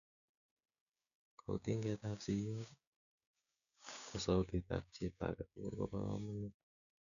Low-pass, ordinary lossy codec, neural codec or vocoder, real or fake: 7.2 kHz; none; codec, 16 kHz, 6 kbps, DAC; fake